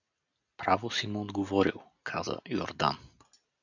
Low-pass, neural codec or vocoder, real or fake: 7.2 kHz; none; real